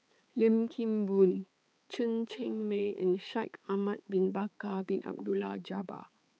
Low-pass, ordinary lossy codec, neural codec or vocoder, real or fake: none; none; codec, 16 kHz, 4 kbps, X-Codec, HuBERT features, trained on balanced general audio; fake